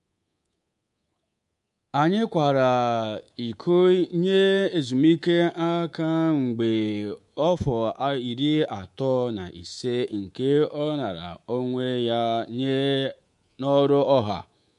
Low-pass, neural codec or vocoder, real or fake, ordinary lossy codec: 10.8 kHz; codec, 24 kHz, 3.1 kbps, DualCodec; fake; MP3, 64 kbps